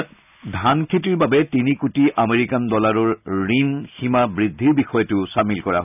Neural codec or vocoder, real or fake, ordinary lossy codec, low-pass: none; real; none; 3.6 kHz